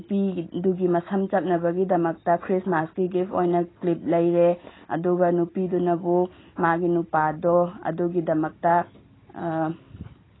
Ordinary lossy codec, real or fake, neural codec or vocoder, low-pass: AAC, 16 kbps; real; none; 7.2 kHz